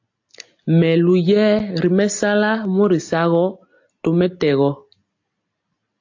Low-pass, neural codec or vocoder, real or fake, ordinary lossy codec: 7.2 kHz; none; real; AAC, 48 kbps